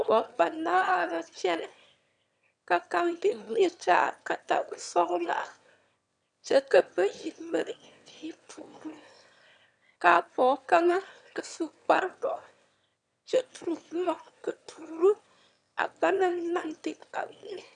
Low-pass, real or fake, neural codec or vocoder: 9.9 kHz; fake; autoencoder, 22.05 kHz, a latent of 192 numbers a frame, VITS, trained on one speaker